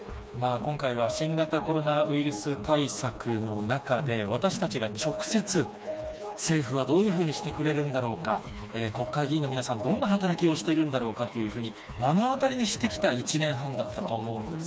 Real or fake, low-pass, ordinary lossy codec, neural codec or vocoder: fake; none; none; codec, 16 kHz, 2 kbps, FreqCodec, smaller model